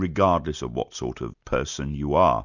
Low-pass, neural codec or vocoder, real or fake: 7.2 kHz; none; real